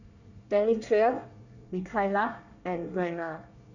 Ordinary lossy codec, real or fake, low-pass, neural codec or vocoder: none; fake; 7.2 kHz; codec, 24 kHz, 1 kbps, SNAC